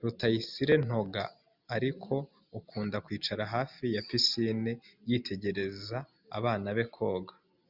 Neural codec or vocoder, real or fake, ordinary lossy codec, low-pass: none; real; Opus, 64 kbps; 5.4 kHz